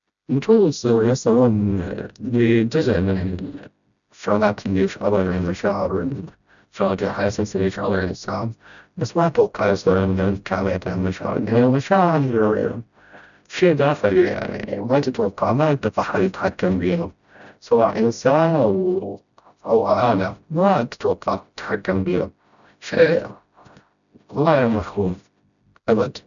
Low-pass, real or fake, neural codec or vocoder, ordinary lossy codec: 7.2 kHz; fake; codec, 16 kHz, 0.5 kbps, FreqCodec, smaller model; none